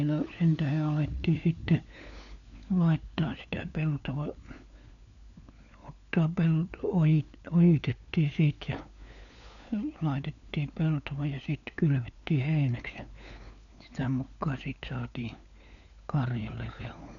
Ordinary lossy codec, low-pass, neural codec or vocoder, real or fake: none; 7.2 kHz; codec, 16 kHz, 4 kbps, FunCodec, trained on LibriTTS, 50 frames a second; fake